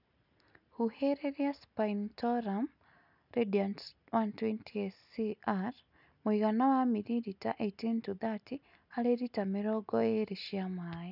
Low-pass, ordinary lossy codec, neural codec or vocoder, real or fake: 5.4 kHz; none; none; real